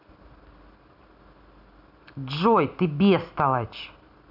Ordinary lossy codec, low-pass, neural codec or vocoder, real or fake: none; 5.4 kHz; none; real